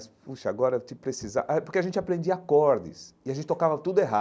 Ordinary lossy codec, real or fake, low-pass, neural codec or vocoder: none; real; none; none